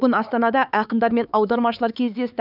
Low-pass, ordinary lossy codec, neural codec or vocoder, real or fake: 5.4 kHz; none; codec, 16 kHz, 4 kbps, X-Codec, HuBERT features, trained on LibriSpeech; fake